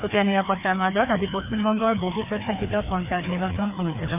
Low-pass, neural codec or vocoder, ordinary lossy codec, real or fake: 3.6 kHz; codec, 16 kHz, 2 kbps, FreqCodec, larger model; none; fake